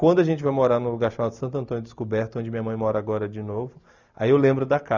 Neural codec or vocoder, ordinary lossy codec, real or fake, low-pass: none; none; real; 7.2 kHz